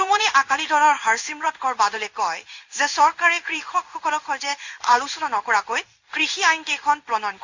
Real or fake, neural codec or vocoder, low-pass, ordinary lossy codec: fake; codec, 16 kHz in and 24 kHz out, 1 kbps, XY-Tokenizer; 7.2 kHz; Opus, 64 kbps